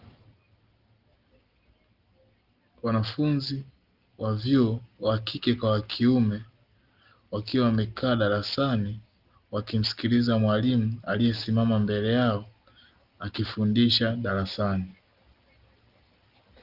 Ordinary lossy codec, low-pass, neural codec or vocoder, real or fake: Opus, 32 kbps; 5.4 kHz; none; real